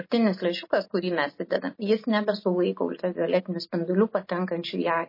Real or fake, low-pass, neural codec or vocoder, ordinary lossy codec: real; 5.4 kHz; none; MP3, 24 kbps